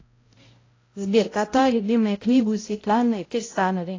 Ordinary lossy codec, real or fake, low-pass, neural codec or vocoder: AAC, 32 kbps; fake; 7.2 kHz; codec, 16 kHz, 0.5 kbps, X-Codec, HuBERT features, trained on balanced general audio